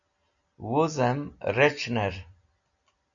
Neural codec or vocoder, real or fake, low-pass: none; real; 7.2 kHz